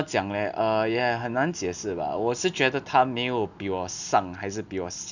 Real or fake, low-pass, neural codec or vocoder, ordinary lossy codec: real; 7.2 kHz; none; none